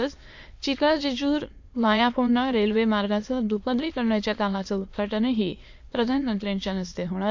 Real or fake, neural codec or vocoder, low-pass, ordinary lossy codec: fake; autoencoder, 22.05 kHz, a latent of 192 numbers a frame, VITS, trained on many speakers; 7.2 kHz; MP3, 48 kbps